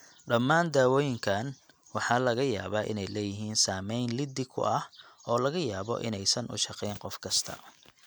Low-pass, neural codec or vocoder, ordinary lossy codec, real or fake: none; none; none; real